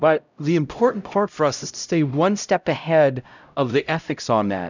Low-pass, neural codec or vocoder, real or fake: 7.2 kHz; codec, 16 kHz, 0.5 kbps, X-Codec, HuBERT features, trained on LibriSpeech; fake